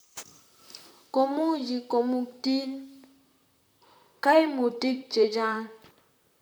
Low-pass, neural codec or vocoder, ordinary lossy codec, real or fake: none; vocoder, 44.1 kHz, 128 mel bands, Pupu-Vocoder; none; fake